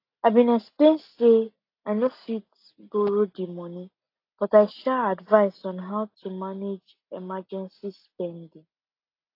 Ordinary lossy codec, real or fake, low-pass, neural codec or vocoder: AAC, 32 kbps; real; 5.4 kHz; none